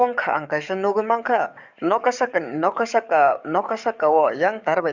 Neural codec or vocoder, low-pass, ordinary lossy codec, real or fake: codec, 44.1 kHz, 7.8 kbps, DAC; 7.2 kHz; Opus, 64 kbps; fake